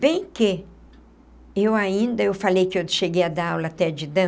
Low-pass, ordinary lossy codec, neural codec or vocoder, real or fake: none; none; none; real